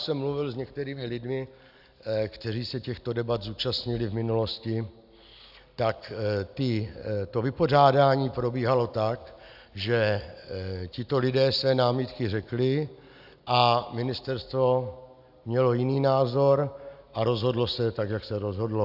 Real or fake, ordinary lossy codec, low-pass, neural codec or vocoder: real; AAC, 48 kbps; 5.4 kHz; none